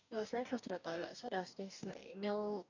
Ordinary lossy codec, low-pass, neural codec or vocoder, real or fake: none; 7.2 kHz; codec, 44.1 kHz, 2.6 kbps, DAC; fake